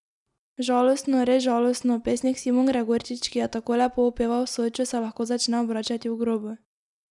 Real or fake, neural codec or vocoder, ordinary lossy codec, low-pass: real; none; none; 10.8 kHz